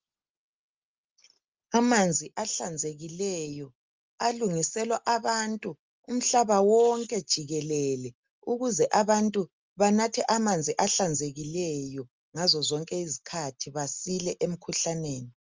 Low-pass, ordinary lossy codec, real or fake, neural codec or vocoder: 7.2 kHz; Opus, 32 kbps; real; none